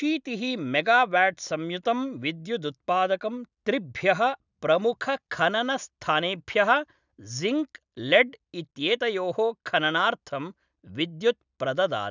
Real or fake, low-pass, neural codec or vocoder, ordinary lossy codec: real; 7.2 kHz; none; none